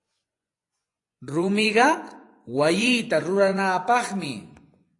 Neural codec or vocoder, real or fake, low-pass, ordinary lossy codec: vocoder, 24 kHz, 100 mel bands, Vocos; fake; 10.8 kHz; AAC, 48 kbps